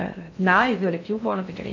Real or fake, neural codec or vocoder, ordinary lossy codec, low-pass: fake; codec, 16 kHz in and 24 kHz out, 0.6 kbps, FocalCodec, streaming, 2048 codes; none; 7.2 kHz